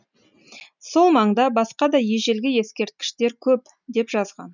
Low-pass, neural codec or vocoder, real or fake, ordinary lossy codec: 7.2 kHz; none; real; none